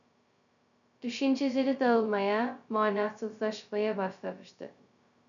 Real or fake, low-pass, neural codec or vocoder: fake; 7.2 kHz; codec, 16 kHz, 0.2 kbps, FocalCodec